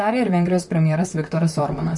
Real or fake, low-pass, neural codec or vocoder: fake; 10.8 kHz; vocoder, 44.1 kHz, 128 mel bands, Pupu-Vocoder